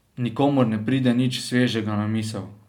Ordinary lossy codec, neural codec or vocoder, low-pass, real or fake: none; none; 19.8 kHz; real